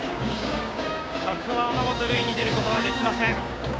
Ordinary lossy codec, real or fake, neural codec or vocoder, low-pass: none; fake; codec, 16 kHz, 6 kbps, DAC; none